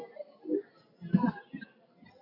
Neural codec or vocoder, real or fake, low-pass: none; real; 5.4 kHz